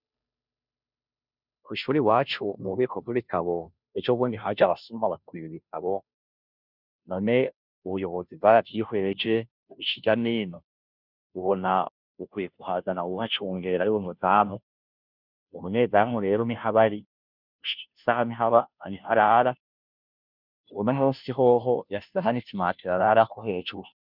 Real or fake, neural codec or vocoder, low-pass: fake; codec, 16 kHz, 0.5 kbps, FunCodec, trained on Chinese and English, 25 frames a second; 5.4 kHz